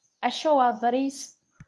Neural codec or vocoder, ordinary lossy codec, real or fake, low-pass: codec, 24 kHz, 0.9 kbps, WavTokenizer, medium speech release version 1; none; fake; none